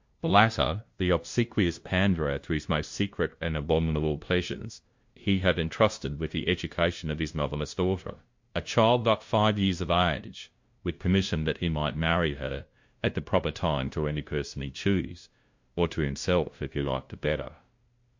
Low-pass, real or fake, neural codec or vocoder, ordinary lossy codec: 7.2 kHz; fake; codec, 16 kHz, 0.5 kbps, FunCodec, trained on LibriTTS, 25 frames a second; MP3, 48 kbps